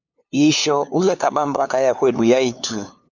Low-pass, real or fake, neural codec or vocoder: 7.2 kHz; fake; codec, 16 kHz, 2 kbps, FunCodec, trained on LibriTTS, 25 frames a second